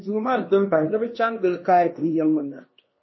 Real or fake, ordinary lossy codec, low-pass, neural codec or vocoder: fake; MP3, 24 kbps; 7.2 kHz; codec, 16 kHz, 1 kbps, X-Codec, HuBERT features, trained on LibriSpeech